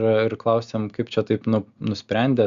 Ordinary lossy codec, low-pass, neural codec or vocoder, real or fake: Opus, 64 kbps; 7.2 kHz; none; real